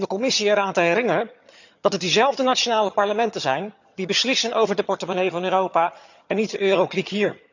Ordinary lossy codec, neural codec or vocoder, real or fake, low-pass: none; vocoder, 22.05 kHz, 80 mel bands, HiFi-GAN; fake; 7.2 kHz